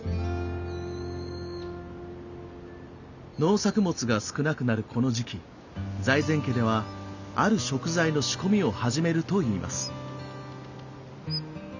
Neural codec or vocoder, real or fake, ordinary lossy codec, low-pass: none; real; none; 7.2 kHz